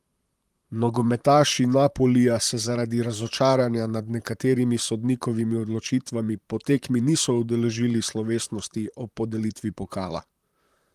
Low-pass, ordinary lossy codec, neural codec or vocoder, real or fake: 14.4 kHz; Opus, 32 kbps; vocoder, 44.1 kHz, 128 mel bands, Pupu-Vocoder; fake